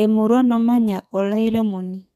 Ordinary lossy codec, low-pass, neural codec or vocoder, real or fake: none; 14.4 kHz; codec, 32 kHz, 1.9 kbps, SNAC; fake